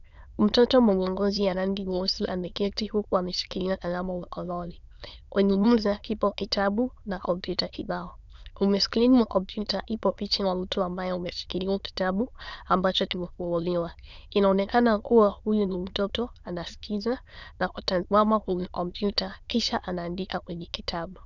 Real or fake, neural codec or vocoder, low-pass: fake; autoencoder, 22.05 kHz, a latent of 192 numbers a frame, VITS, trained on many speakers; 7.2 kHz